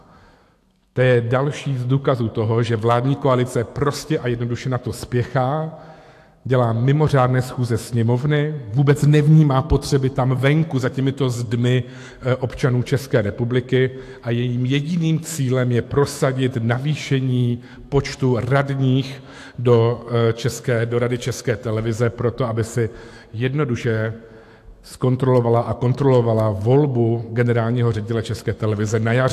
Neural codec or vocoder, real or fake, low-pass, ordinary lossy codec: autoencoder, 48 kHz, 128 numbers a frame, DAC-VAE, trained on Japanese speech; fake; 14.4 kHz; AAC, 64 kbps